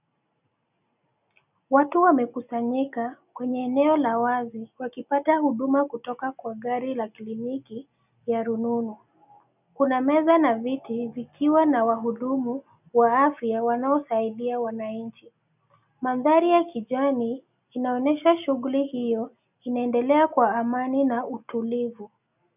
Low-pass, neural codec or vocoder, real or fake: 3.6 kHz; none; real